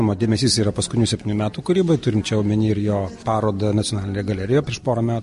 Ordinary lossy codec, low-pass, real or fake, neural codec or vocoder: MP3, 48 kbps; 14.4 kHz; real; none